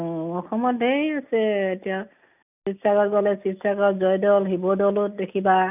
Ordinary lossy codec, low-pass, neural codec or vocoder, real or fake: none; 3.6 kHz; none; real